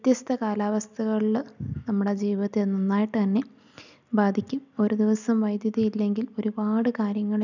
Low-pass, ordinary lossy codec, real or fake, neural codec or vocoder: 7.2 kHz; none; real; none